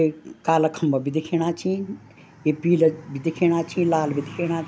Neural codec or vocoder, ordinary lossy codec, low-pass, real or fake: none; none; none; real